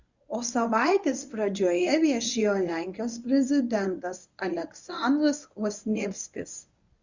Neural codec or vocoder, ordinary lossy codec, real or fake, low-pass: codec, 24 kHz, 0.9 kbps, WavTokenizer, medium speech release version 1; Opus, 64 kbps; fake; 7.2 kHz